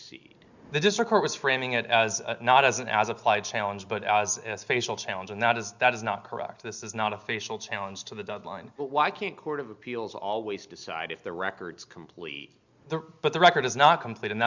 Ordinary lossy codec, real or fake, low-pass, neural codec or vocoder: Opus, 64 kbps; real; 7.2 kHz; none